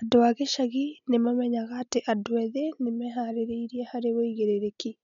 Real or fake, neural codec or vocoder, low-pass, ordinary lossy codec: real; none; 7.2 kHz; MP3, 96 kbps